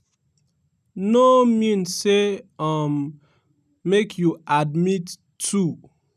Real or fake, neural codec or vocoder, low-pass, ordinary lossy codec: real; none; 14.4 kHz; none